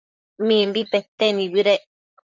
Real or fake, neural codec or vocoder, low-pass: fake; vocoder, 44.1 kHz, 128 mel bands, Pupu-Vocoder; 7.2 kHz